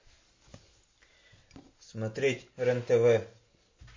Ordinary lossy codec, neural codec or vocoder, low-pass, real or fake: MP3, 32 kbps; none; 7.2 kHz; real